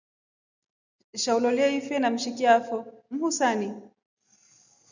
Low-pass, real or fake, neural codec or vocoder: 7.2 kHz; real; none